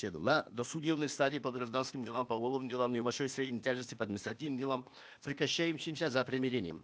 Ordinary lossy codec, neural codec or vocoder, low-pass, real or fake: none; codec, 16 kHz, 0.8 kbps, ZipCodec; none; fake